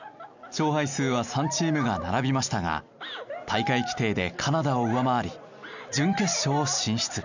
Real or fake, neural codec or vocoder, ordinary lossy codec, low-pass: real; none; none; 7.2 kHz